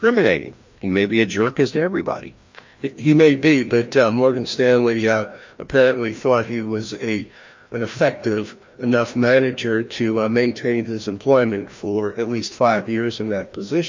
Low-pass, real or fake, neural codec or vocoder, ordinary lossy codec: 7.2 kHz; fake; codec, 16 kHz, 1 kbps, FreqCodec, larger model; MP3, 48 kbps